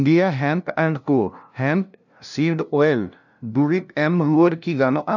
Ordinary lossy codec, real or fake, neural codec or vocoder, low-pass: none; fake; codec, 16 kHz, 0.5 kbps, FunCodec, trained on LibriTTS, 25 frames a second; 7.2 kHz